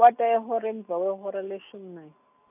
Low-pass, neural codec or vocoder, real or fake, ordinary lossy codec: 3.6 kHz; none; real; none